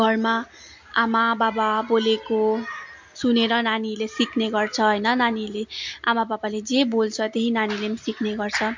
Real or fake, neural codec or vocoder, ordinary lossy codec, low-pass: real; none; MP3, 48 kbps; 7.2 kHz